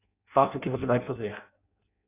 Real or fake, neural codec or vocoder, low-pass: fake; codec, 16 kHz in and 24 kHz out, 0.6 kbps, FireRedTTS-2 codec; 3.6 kHz